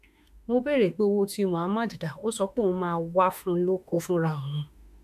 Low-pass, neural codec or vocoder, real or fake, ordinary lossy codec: 14.4 kHz; autoencoder, 48 kHz, 32 numbers a frame, DAC-VAE, trained on Japanese speech; fake; none